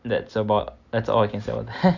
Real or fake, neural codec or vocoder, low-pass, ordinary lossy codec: real; none; 7.2 kHz; none